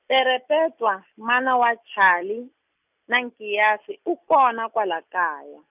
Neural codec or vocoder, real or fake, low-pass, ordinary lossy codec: none; real; 3.6 kHz; none